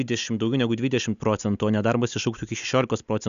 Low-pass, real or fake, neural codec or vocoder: 7.2 kHz; real; none